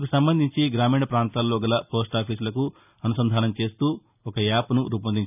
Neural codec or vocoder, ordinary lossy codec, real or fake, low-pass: none; none; real; 3.6 kHz